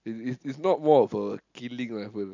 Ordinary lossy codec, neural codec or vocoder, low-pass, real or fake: none; none; 7.2 kHz; real